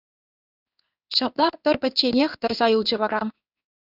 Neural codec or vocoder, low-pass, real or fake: codec, 16 kHz in and 24 kHz out, 1 kbps, XY-Tokenizer; 5.4 kHz; fake